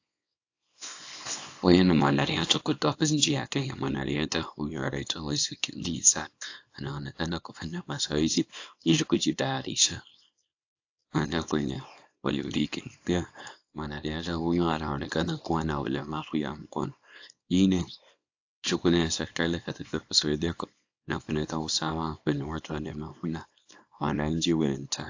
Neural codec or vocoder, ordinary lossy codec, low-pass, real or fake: codec, 24 kHz, 0.9 kbps, WavTokenizer, small release; AAC, 48 kbps; 7.2 kHz; fake